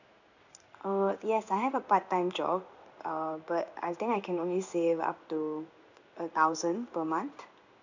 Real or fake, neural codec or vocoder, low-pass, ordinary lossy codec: fake; codec, 16 kHz in and 24 kHz out, 1 kbps, XY-Tokenizer; 7.2 kHz; none